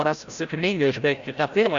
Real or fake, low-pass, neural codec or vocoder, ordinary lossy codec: fake; 7.2 kHz; codec, 16 kHz, 0.5 kbps, FreqCodec, larger model; Opus, 64 kbps